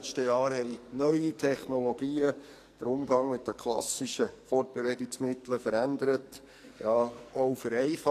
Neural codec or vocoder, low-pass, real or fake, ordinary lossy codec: codec, 44.1 kHz, 2.6 kbps, SNAC; 14.4 kHz; fake; AAC, 64 kbps